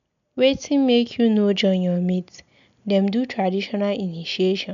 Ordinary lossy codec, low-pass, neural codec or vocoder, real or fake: none; 7.2 kHz; none; real